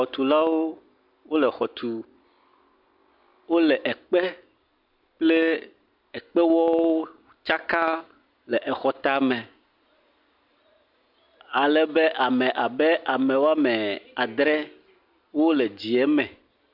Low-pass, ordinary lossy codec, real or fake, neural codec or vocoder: 5.4 kHz; MP3, 48 kbps; real; none